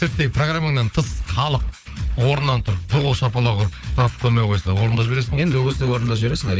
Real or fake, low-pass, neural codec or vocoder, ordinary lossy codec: fake; none; codec, 16 kHz, 4 kbps, FunCodec, trained on Chinese and English, 50 frames a second; none